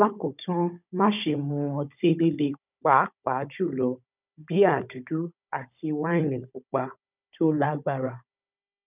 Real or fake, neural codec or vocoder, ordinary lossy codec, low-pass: fake; codec, 16 kHz, 16 kbps, FunCodec, trained on Chinese and English, 50 frames a second; none; 3.6 kHz